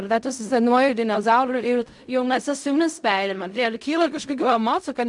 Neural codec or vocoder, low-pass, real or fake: codec, 16 kHz in and 24 kHz out, 0.4 kbps, LongCat-Audio-Codec, fine tuned four codebook decoder; 10.8 kHz; fake